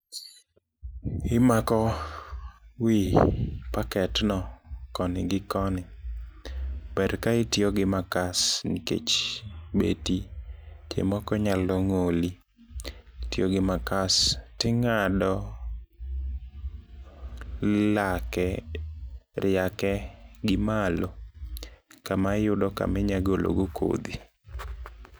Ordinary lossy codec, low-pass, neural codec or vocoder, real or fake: none; none; none; real